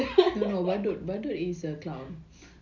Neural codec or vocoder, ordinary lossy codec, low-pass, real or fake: none; none; 7.2 kHz; real